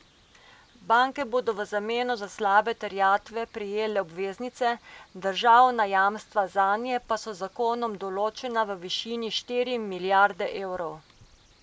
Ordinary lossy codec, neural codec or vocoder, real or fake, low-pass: none; none; real; none